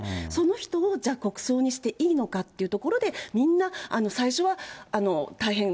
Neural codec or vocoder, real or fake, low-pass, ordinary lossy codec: none; real; none; none